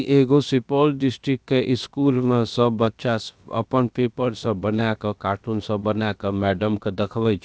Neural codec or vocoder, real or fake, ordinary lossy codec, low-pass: codec, 16 kHz, about 1 kbps, DyCAST, with the encoder's durations; fake; none; none